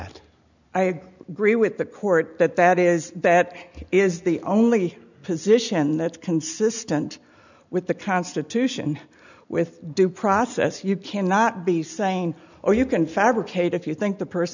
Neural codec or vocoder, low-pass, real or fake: none; 7.2 kHz; real